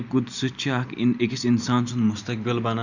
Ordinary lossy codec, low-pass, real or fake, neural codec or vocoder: none; 7.2 kHz; real; none